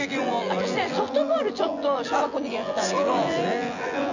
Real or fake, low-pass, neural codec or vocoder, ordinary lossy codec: fake; 7.2 kHz; vocoder, 24 kHz, 100 mel bands, Vocos; none